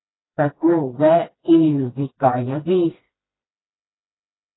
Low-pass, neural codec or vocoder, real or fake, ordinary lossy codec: 7.2 kHz; codec, 16 kHz, 1 kbps, FreqCodec, smaller model; fake; AAC, 16 kbps